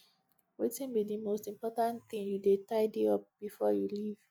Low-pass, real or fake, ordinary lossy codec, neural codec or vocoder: 19.8 kHz; real; none; none